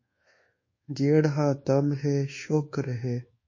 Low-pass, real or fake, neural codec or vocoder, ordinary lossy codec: 7.2 kHz; fake; codec, 24 kHz, 1.2 kbps, DualCodec; MP3, 32 kbps